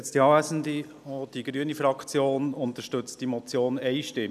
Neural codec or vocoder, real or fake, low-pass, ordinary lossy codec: none; real; 14.4 kHz; none